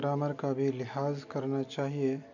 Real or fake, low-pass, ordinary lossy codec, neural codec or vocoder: real; 7.2 kHz; none; none